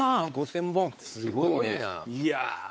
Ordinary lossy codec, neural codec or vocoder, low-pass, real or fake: none; codec, 16 kHz, 4 kbps, X-Codec, WavLM features, trained on Multilingual LibriSpeech; none; fake